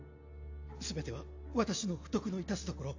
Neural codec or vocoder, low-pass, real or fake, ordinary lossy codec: none; 7.2 kHz; real; AAC, 48 kbps